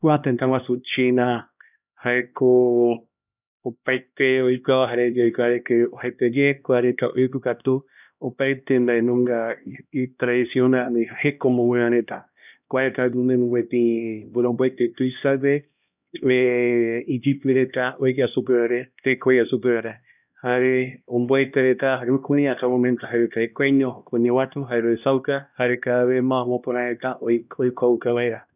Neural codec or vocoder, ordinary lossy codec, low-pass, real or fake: codec, 16 kHz, 1 kbps, X-Codec, HuBERT features, trained on LibriSpeech; none; 3.6 kHz; fake